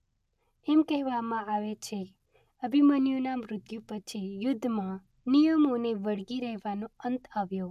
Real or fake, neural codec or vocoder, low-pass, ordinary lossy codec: real; none; 14.4 kHz; none